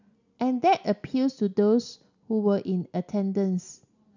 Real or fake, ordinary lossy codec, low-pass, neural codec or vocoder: real; none; 7.2 kHz; none